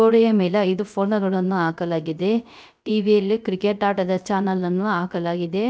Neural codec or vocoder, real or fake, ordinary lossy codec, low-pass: codec, 16 kHz, 0.3 kbps, FocalCodec; fake; none; none